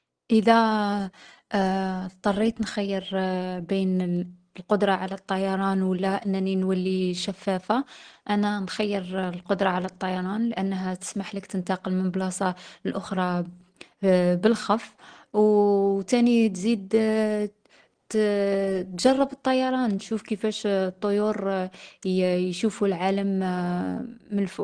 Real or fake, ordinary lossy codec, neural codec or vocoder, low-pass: real; Opus, 16 kbps; none; 9.9 kHz